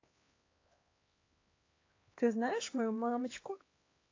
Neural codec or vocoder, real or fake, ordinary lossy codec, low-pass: codec, 16 kHz, 2 kbps, X-Codec, HuBERT features, trained on LibriSpeech; fake; AAC, 32 kbps; 7.2 kHz